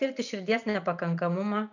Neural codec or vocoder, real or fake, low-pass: none; real; 7.2 kHz